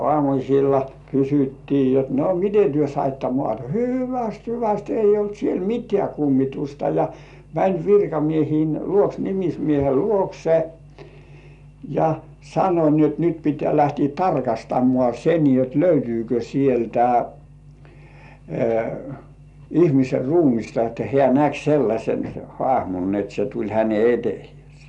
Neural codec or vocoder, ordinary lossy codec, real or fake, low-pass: none; none; real; 10.8 kHz